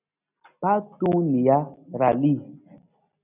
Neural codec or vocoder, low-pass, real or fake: none; 3.6 kHz; real